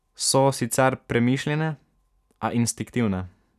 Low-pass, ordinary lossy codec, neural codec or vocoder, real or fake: 14.4 kHz; none; none; real